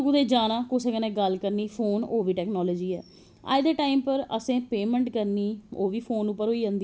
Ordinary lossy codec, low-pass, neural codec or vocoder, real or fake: none; none; none; real